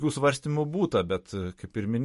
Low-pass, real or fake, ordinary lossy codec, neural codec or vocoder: 14.4 kHz; real; MP3, 48 kbps; none